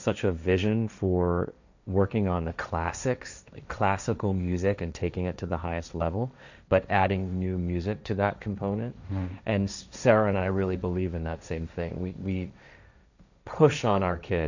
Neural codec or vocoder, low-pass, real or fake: codec, 16 kHz, 1.1 kbps, Voila-Tokenizer; 7.2 kHz; fake